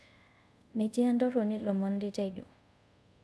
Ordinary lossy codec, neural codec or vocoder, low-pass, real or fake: none; codec, 24 kHz, 0.5 kbps, DualCodec; none; fake